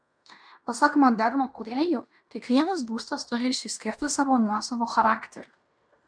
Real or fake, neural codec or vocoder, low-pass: fake; codec, 16 kHz in and 24 kHz out, 0.9 kbps, LongCat-Audio-Codec, fine tuned four codebook decoder; 9.9 kHz